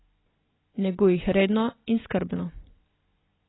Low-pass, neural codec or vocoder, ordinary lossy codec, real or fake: 7.2 kHz; none; AAC, 16 kbps; real